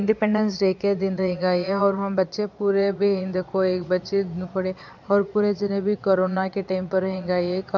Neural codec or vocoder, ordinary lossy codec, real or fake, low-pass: vocoder, 22.05 kHz, 80 mel bands, Vocos; none; fake; 7.2 kHz